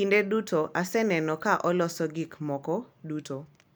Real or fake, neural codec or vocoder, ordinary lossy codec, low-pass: real; none; none; none